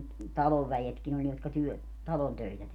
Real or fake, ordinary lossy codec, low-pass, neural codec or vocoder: real; none; 19.8 kHz; none